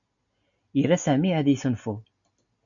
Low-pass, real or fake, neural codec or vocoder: 7.2 kHz; real; none